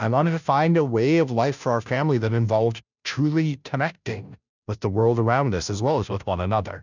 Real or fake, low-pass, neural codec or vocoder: fake; 7.2 kHz; codec, 16 kHz, 0.5 kbps, FunCodec, trained on Chinese and English, 25 frames a second